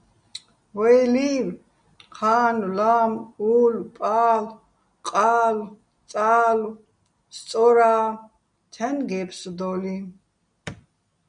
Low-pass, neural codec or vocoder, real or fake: 9.9 kHz; none; real